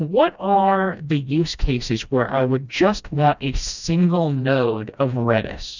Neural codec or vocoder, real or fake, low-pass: codec, 16 kHz, 1 kbps, FreqCodec, smaller model; fake; 7.2 kHz